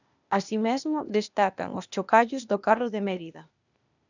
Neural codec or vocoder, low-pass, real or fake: codec, 16 kHz, 0.8 kbps, ZipCodec; 7.2 kHz; fake